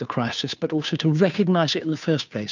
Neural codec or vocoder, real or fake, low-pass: codec, 16 kHz, 2 kbps, FunCodec, trained on Chinese and English, 25 frames a second; fake; 7.2 kHz